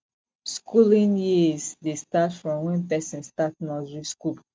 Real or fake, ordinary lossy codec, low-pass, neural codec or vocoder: real; none; none; none